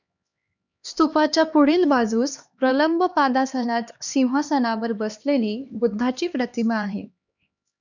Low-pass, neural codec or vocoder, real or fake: 7.2 kHz; codec, 16 kHz, 2 kbps, X-Codec, HuBERT features, trained on LibriSpeech; fake